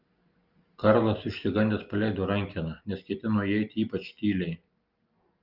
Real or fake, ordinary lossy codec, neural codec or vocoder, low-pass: real; Opus, 24 kbps; none; 5.4 kHz